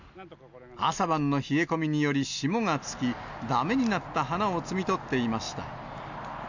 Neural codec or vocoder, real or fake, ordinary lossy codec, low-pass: none; real; none; 7.2 kHz